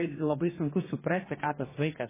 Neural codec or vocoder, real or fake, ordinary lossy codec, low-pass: codec, 44.1 kHz, 2.6 kbps, DAC; fake; MP3, 16 kbps; 3.6 kHz